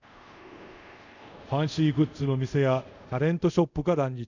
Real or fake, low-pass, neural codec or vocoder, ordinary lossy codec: fake; 7.2 kHz; codec, 24 kHz, 0.5 kbps, DualCodec; none